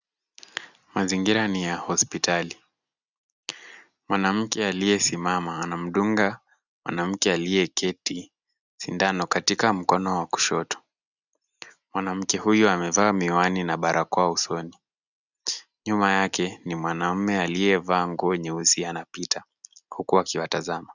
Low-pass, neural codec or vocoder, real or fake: 7.2 kHz; none; real